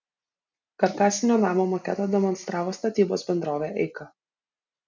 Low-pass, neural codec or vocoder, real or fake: 7.2 kHz; none; real